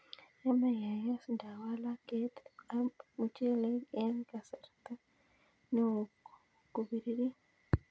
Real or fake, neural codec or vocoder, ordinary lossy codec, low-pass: real; none; none; none